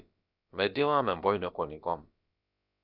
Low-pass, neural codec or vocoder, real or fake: 5.4 kHz; codec, 16 kHz, about 1 kbps, DyCAST, with the encoder's durations; fake